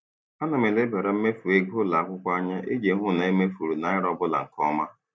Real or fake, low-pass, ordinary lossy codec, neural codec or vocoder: real; none; none; none